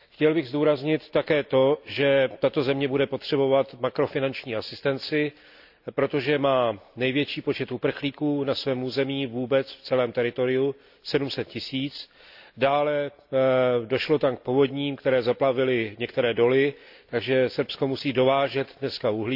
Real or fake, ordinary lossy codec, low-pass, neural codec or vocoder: real; AAC, 48 kbps; 5.4 kHz; none